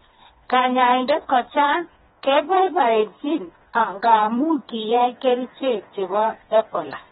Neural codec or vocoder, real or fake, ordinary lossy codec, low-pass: codec, 16 kHz, 2 kbps, FreqCodec, smaller model; fake; AAC, 16 kbps; 7.2 kHz